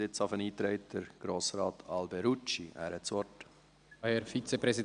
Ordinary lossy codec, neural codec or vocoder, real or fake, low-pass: none; none; real; 9.9 kHz